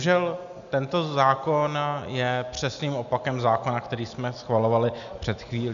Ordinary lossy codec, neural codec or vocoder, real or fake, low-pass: AAC, 96 kbps; none; real; 7.2 kHz